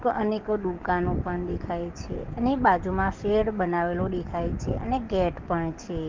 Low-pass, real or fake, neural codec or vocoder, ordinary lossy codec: 7.2 kHz; fake; codec, 44.1 kHz, 7.8 kbps, DAC; Opus, 32 kbps